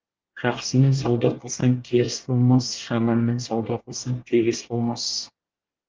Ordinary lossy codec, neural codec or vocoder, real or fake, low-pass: Opus, 24 kbps; codec, 24 kHz, 1 kbps, SNAC; fake; 7.2 kHz